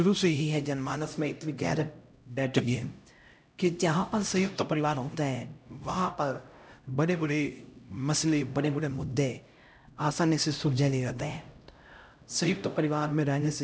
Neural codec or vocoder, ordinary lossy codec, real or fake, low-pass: codec, 16 kHz, 0.5 kbps, X-Codec, HuBERT features, trained on LibriSpeech; none; fake; none